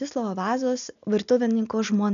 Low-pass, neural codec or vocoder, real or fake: 7.2 kHz; none; real